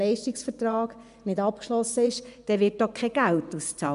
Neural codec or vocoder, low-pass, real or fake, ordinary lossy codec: vocoder, 24 kHz, 100 mel bands, Vocos; 10.8 kHz; fake; none